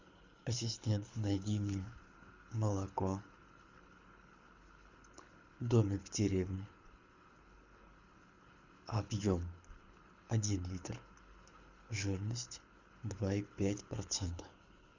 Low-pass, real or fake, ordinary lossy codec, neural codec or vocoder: 7.2 kHz; fake; Opus, 64 kbps; codec, 24 kHz, 6 kbps, HILCodec